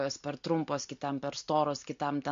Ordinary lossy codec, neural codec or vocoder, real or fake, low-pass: MP3, 48 kbps; none; real; 7.2 kHz